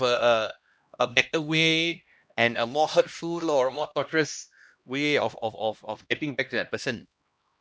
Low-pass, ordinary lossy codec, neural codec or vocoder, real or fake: none; none; codec, 16 kHz, 1 kbps, X-Codec, HuBERT features, trained on LibriSpeech; fake